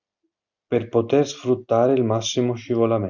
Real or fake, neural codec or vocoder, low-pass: real; none; 7.2 kHz